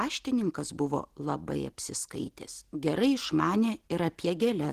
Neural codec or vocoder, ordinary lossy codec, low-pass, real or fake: none; Opus, 16 kbps; 14.4 kHz; real